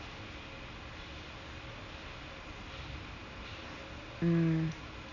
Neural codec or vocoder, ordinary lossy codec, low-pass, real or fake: none; none; 7.2 kHz; real